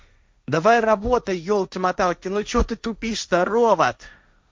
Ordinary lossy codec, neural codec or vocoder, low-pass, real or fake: none; codec, 16 kHz, 1.1 kbps, Voila-Tokenizer; none; fake